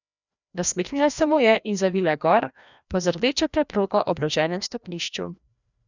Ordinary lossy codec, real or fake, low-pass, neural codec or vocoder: none; fake; 7.2 kHz; codec, 16 kHz, 1 kbps, FreqCodec, larger model